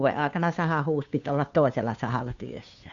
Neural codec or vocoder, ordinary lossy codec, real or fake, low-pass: codec, 16 kHz, 2 kbps, FunCodec, trained on Chinese and English, 25 frames a second; MP3, 64 kbps; fake; 7.2 kHz